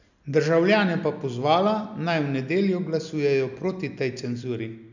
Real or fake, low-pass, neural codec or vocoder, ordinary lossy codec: real; 7.2 kHz; none; none